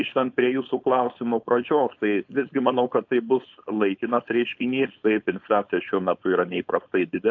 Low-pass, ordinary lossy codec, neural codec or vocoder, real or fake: 7.2 kHz; AAC, 48 kbps; codec, 16 kHz, 4.8 kbps, FACodec; fake